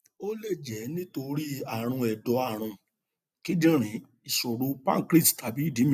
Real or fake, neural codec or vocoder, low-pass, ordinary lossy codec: real; none; 14.4 kHz; none